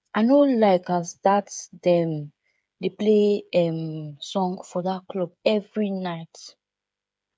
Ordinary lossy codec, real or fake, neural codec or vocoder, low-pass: none; fake; codec, 16 kHz, 8 kbps, FreqCodec, smaller model; none